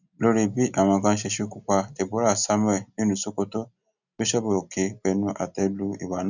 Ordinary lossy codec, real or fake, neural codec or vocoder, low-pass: none; real; none; 7.2 kHz